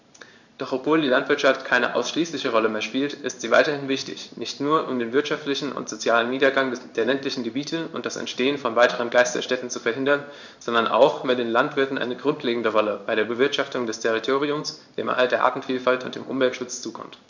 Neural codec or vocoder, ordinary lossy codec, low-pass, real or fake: codec, 16 kHz in and 24 kHz out, 1 kbps, XY-Tokenizer; none; 7.2 kHz; fake